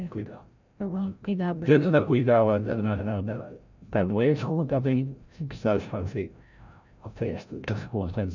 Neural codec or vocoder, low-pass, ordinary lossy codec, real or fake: codec, 16 kHz, 0.5 kbps, FreqCodec, larger model; 7.2 kHz; none; fake